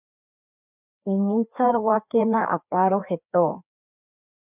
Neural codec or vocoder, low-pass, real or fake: codec, 16 kHz, 2 kbps, FreqCodec, larger model; 3.6 kHz; fake